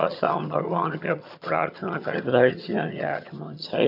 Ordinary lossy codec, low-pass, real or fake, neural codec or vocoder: none; 5.4 kHz; fake; vocoder, 22.05 kHz, 80 mel bands, HiFi-GAN